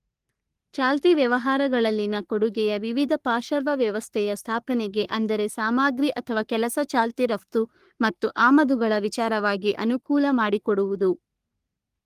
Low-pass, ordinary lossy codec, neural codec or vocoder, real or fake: 14.4 kHz; Opus, 24 kbps; codec, 44.1 kHz, 3.4 kbps, Pupu-Codec; fake